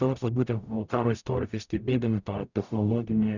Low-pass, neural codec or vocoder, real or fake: 7.2 kHz; codec, 44.1 kHz, 0.9 kbps, DAC; fake